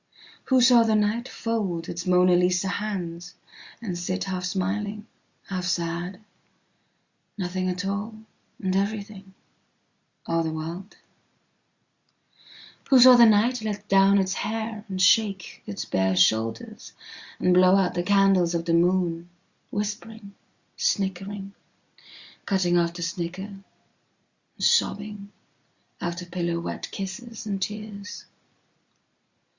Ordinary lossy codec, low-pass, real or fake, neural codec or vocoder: Opus, 64 kbps; 7.2 kHz; real; none